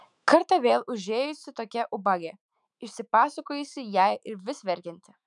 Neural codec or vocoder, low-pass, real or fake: autoencoder, 48 kHz, 128 numbers a frame, DAC-VAE, trained on Japanese speech; 10.8 kHz; fake